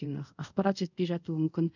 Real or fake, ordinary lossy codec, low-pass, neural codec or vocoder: fake; none; 7.2 kHz; codec, 24 kHz, 0.5 kbps, DualCodec